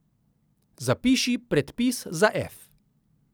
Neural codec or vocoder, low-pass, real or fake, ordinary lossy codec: none; none; real; none